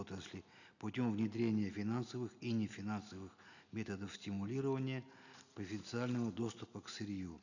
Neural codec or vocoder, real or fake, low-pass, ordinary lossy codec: none; real; 7.2 kHz; none